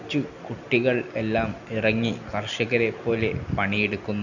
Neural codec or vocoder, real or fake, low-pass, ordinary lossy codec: none; real; 7.2 kHz; none